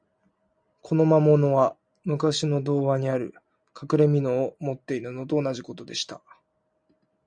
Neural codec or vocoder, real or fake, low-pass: none; real; 9.9 kHz